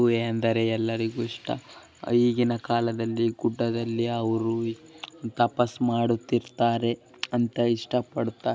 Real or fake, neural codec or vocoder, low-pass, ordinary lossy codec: real; none; none; none